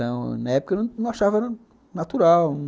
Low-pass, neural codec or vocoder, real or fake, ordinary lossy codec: none; none; real; none